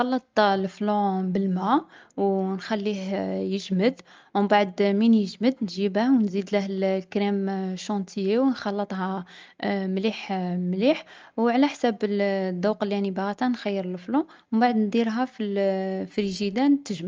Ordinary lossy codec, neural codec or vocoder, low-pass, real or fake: Opus, 24 kbps; none; 7.2 kHz; real